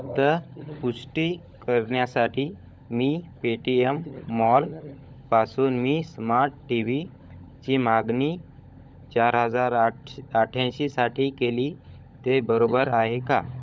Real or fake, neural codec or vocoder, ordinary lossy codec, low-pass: fake; codec, 16 kHz, 16 kbps, FunCodec, trained on LibriTTS, 50 frames a second; none; none